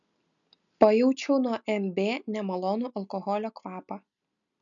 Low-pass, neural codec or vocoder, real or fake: 7.2 kHz; none; real